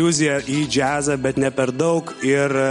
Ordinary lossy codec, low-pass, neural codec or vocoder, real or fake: MP3, 48 kbps; 19.8 kHz; none; real